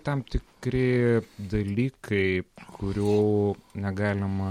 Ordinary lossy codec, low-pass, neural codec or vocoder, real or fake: MP3, 64 kbps; 19.8 kHz; none; real